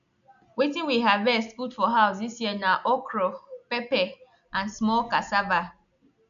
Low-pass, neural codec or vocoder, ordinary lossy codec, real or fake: 7.2 kHz; none; none; real